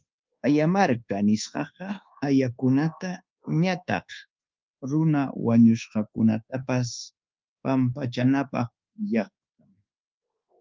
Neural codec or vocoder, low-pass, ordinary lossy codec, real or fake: codec, 24 kHz, 1.2 kbps, DualCodec; 7.2 kHz; Opus, 24 kbps; fake